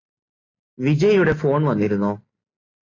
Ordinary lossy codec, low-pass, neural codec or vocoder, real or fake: AAC, 32 kbps; 7.2 kHz; none; real